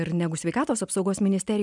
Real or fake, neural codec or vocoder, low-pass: real; none; 10.8 kHz